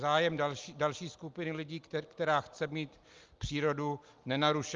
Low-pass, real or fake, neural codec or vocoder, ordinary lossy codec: 7.2 kHz; real; none; Opus, 32 kbps